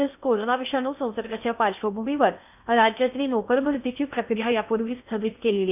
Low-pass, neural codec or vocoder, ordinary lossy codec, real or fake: 3.6 kHz; codec, 16 kHz in and 24 kHz out, 0.8 kbps, FocalCodec, streaming, 65536 codes; none; fake